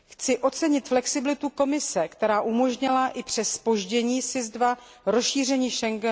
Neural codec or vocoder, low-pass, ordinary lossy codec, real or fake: none; none; none; real